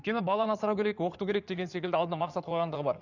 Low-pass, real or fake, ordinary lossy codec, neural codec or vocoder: 7.2 kHz; fake; none; codec, 16 kHz in and 24 kHz out, 2.2 kbps, FireRedTTS-2 codec